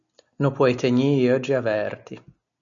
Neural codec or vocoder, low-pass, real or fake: none; 7.2 kHz; real